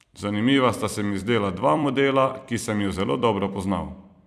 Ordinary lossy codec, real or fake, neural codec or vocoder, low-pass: none; fake; vocoder, 44.1 kHz, 128 mel bands every 256 samples, BigVGAN v2; 14.4 kHz